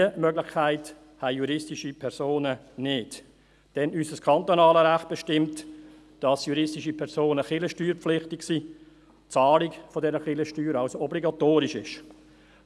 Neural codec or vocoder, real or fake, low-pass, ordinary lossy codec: none; real; none; none